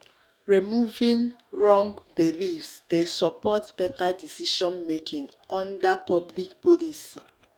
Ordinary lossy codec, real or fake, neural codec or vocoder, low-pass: none; fake; codec, 44.1 kHz, 2.6 kbps, DAC; 19.8 kHz